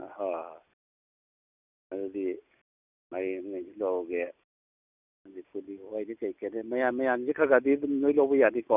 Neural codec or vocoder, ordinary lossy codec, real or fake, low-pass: none; none; real; 3.6 kHz